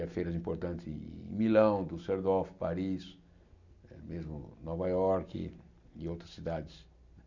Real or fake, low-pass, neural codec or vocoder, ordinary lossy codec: real; 7.2 kHz; none; none